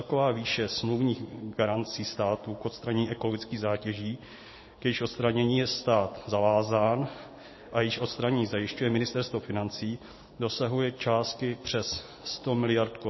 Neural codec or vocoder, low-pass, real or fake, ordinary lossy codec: none; 7.2 kHz; real; MP3, 24 kbps